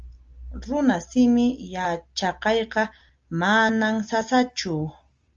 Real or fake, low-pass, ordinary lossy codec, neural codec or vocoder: real; 7.2 kHz; Opus, 32 kbps; none